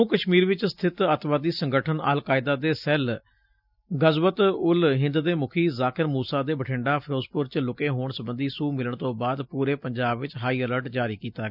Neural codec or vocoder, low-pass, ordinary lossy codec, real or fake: none; 5.4 kHz; none; real